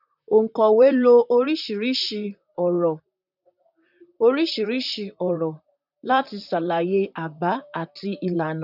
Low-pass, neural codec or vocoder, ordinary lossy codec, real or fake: 5.4 kHz; vocoder, 44.1 kHz, 128 mel bands, Pupu-Vocoder; none; fake